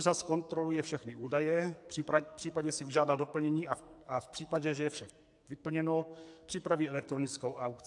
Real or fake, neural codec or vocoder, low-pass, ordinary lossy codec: fake; codec, 44.1 kHz, 2.6 kbps, SNAC; 10.8 kHz; AAC, 64 kbps